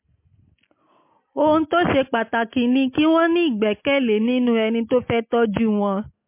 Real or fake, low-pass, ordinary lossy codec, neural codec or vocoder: real; 3.6 kHz; MP3, 24 kbps; none